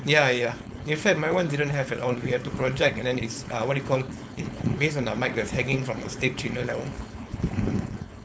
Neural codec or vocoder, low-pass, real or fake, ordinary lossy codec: codec, 16 kHz, 4.8 kbps, FACodec; none; fake; none